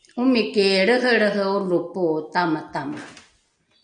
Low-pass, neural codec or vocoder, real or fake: 9.9 kHz; none; real